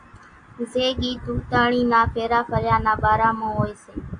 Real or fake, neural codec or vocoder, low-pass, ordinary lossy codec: real; none; 9.9 kHz; AAC, 64 kbps